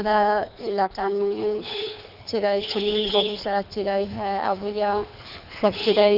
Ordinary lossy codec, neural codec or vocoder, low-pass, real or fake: none; codec, 24 kHz, 3 kbps, HILCodec; 5.4 kHz; fake